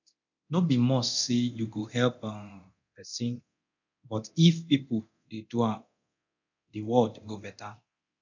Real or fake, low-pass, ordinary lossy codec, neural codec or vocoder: fake; 7.2 kHz; none; codec, 24 kHz, 0.9 kbps, DualCodec